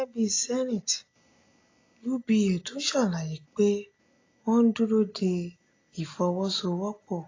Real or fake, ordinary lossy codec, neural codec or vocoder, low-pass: real; AAC, 32 kbps; none; 7.2 kHz